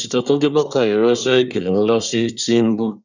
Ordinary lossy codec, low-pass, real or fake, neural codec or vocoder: none; 7.2 kHz; fake; codec, 24 kHz, 1 kbps, SNAC